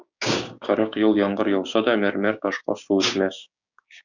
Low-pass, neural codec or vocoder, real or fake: 7.2 kHz; codec, 16 kHz, 6 kbps, DAC; fake